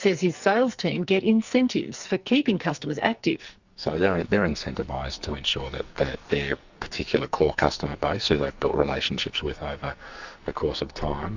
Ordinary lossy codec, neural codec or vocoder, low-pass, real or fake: Opus, 64 kbps; codec, 44.1 kHz, 2.6 kbps, SNAC; 7.2 kHz; fake